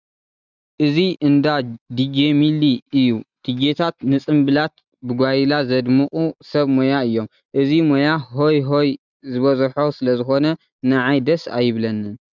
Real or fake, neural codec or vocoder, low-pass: real; none; 7.2 kHz